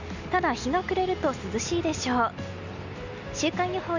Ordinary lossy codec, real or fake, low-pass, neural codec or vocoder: none; real; 7.2 kHz; none